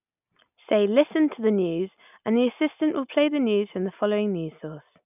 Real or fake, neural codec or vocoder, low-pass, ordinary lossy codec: real; none; 3.6 kHz; none